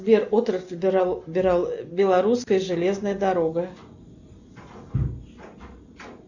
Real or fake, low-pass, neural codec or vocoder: real; 7.2 kHz; none